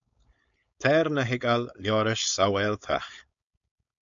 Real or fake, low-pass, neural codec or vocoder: fake; 7.2 kHz; codec, 16 kHz, 4.8 kbps, FACodec